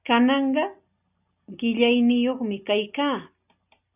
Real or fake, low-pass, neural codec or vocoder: real; 3.6 kHz; none